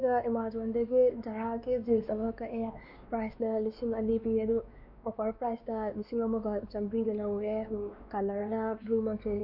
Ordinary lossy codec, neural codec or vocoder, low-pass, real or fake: MP3, 48 kbps; codec, 16 kHz, 2 kbps, X-Codec, WavLM features, trained on Multilingual LibriSpeech; 5.4 kHz; fake